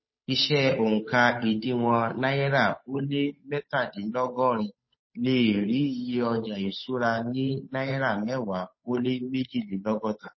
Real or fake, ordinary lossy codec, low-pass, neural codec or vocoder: fake; MP3, 24 kbps; 7.2 kHz; codec, 16 kHz, 8 kbps, FunCodec, trained on Chinese and English, 25 frames a second